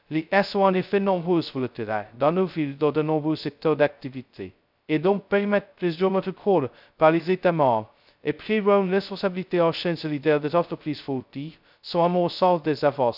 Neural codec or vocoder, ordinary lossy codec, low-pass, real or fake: codec, 16 kHz, 0.2 kbps, FocalCodec; none; 5.4 kHz; fake